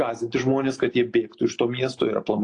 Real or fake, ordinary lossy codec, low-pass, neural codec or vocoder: real; AAC, 48 kbps; 10.8 kHz; none